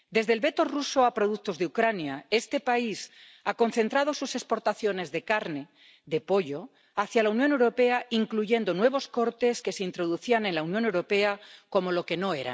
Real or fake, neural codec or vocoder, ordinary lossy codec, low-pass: real; none; none; none